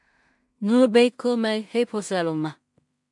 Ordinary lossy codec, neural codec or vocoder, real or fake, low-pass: MP3, 64 kbps; codec, 16 kHz in and 24 kHz out, 0.4 kbps, LongCat-Audio-Codec, two codebook decoder; fake; 10.8 kHz